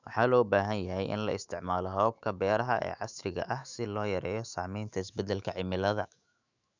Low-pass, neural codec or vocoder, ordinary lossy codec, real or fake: 7.2 kHz; autoencoder, 48 kHz, 128 numbers a frame, DAC-VAE, trained on Japanese speech; none; fake